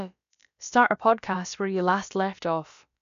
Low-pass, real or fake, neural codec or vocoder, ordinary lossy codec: 7.2 kHz; fake; codec, 16 kHz, about 1 kbps, DyCAST, with the encoder's durations; none